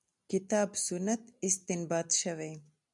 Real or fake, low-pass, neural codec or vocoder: real; 10.8 kHz; none